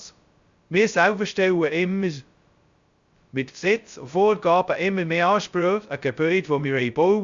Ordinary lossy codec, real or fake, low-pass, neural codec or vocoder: Opus, 64 kbps; fake; 7.2 kHz; codec, 16 kHz, 0.2 kbps, FocalCodec